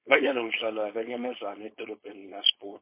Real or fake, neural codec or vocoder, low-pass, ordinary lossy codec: fake; codec, 16 kHz, 4.8 kbps, FACodec; 3.6 kHz; MP3, 24 kbps